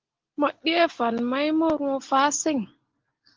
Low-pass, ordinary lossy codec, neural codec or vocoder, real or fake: 7.2 kHz; Opus, 16 kbps; none; real